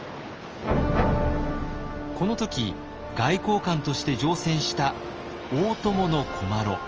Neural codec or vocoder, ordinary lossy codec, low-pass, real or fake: none; Opus, 24 kbps; 7.2 kHz; real